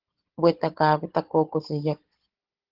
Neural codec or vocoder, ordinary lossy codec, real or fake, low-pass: codec, 16 kHz, 16 kbps, FunCodec, trained on Chinese and English, 50 frames a second; Opus, 16 kbps; fake; 5.4 kHz